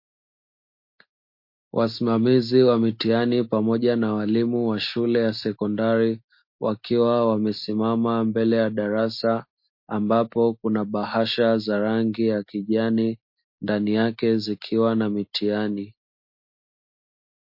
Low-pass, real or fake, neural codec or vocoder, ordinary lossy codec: 5.4 kHz; real; none; MP3, 32 kbps